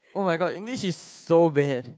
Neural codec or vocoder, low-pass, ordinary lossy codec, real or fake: codec, 16 kHz, 2 kbps, FunCodec, trained on Chinese and English, 25 frames a second; none; none; fake